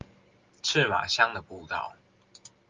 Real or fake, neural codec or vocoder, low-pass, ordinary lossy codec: real; none; 7.2 kHz; Opus, 32 kbps